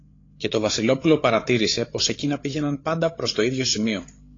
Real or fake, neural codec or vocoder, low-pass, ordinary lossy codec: fake; codec, 16 kHz, 8 kbps, FreqCodec, larger model; 7.2 kHz; AAC, 32 kbps